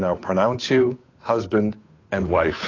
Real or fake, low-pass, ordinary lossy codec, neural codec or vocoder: fake; 7.2 kHz; AAC, 32 kbps; codec, 16 kHz, 8 kbps, FunCodec, trained on Chinese and English, 25 frames a second